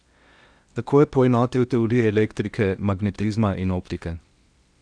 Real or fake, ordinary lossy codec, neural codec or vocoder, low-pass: fake; Opus, 64 kbps; codec, 16 kHz in and 24 kHz out, 0.8 kbps, FocalCodec, streaming, 65536 codes; 9.9 kHz